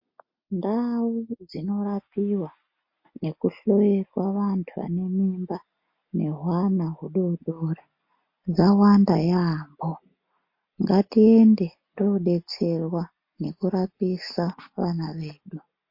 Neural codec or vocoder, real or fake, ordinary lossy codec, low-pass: none; real; MP3, 32 kbps; 5.4 kHz